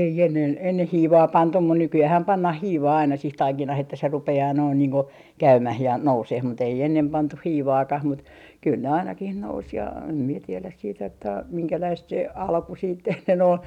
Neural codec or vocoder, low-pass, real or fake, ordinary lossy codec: none; 19.8 kHz; real; none